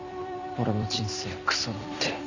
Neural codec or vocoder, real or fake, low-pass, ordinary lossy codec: vocoder, 44.1 kHz, 128 mel bands every 256 samples, BigVGAN v2; fake; 7.2 kHz; none